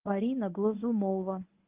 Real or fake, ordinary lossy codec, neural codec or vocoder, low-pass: fake; Opus, 32 kbps; codec, 24 kHz, 0.9 kbps, WavTokenizer, medium speech release version 1; 3.6 kHz